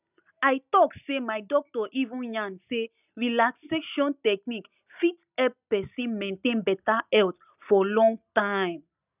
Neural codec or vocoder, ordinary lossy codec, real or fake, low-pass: none; none; real; 3.6 kHz